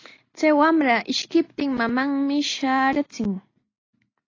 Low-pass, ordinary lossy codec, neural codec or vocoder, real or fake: 7.2 kHz; AAC, 32 kbps; none; real